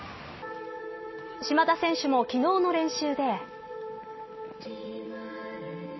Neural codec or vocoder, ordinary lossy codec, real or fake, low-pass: none; MP3, 24 kbps; real; 7.2 kHz